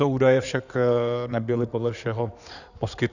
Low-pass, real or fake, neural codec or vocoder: 7.2 kHz; fake; codec, 16 kHz in and 24 kHz out, 2.2 kbps, FireRedTTS-2 codec